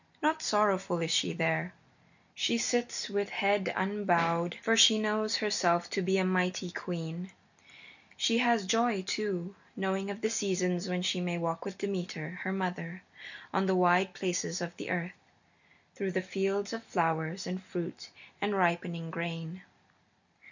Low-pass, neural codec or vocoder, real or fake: 7.2 kHz; none; real